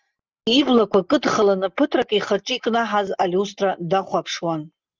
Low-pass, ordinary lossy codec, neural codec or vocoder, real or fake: 7.2 kHz; Opus, 24 kbps; none; real